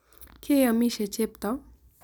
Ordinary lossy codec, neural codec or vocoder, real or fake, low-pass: none; none; real; none